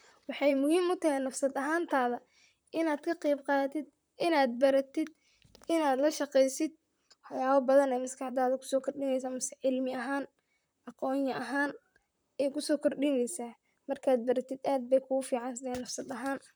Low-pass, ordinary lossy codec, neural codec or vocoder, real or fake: none; none; vocoder, 44.1 kHz, 128 mel bands, Pupu-Vocoder; fake